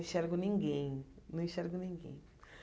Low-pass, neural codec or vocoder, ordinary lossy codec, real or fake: none; none; none; real